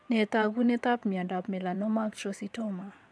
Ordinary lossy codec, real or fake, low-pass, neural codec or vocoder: none; fake; none; vocoder, 22.05 kHz, 80 mel bands, WaveNeXt